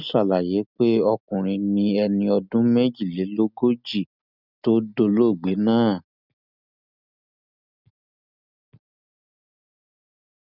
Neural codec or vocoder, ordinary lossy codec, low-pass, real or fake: none; none; 5.4 kHz; real